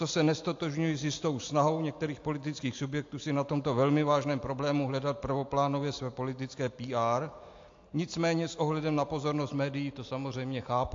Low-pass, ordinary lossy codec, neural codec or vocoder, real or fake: 7.2 kHz; AAC, 48 kbps; none; real